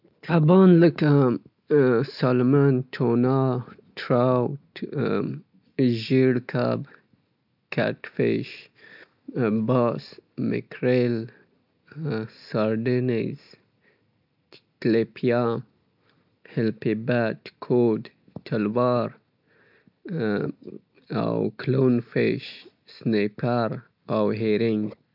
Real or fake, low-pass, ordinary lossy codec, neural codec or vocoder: real; 5.4 kHz; none; none